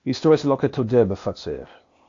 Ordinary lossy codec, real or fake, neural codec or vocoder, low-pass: AAC, 48 kbps; fake; codec, 16 kHz, 0.8 kbps, ZipCodec; 7.2 kHz